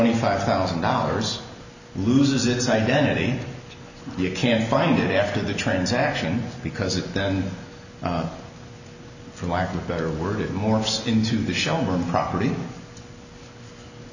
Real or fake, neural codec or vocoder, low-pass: real; none; 7.2 kHz